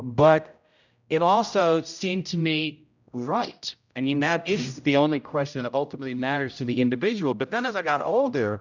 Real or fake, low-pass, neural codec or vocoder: fake; 7.2 kHz; codec, 16 kHz, 0.5 kbps, X-Codec, HuBERT features, trained on general audio